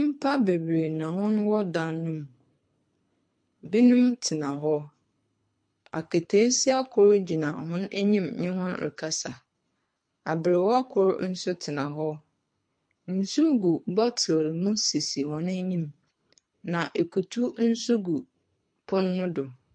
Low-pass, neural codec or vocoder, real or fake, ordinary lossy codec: 9.9 kHz; codec, 24 kHz, 3 kbps, HILCodec; fake; MP3, 48 kbps